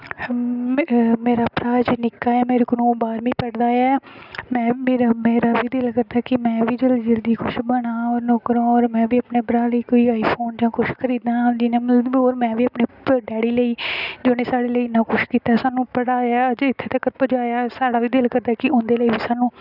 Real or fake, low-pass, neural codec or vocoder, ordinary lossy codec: real; 5.4 kHz; none; none